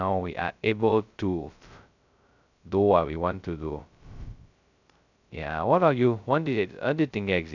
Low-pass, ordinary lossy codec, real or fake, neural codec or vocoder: 7.2 kHz; none; fake; codec, 16 kHz, 0.2 kbps, FocalCodec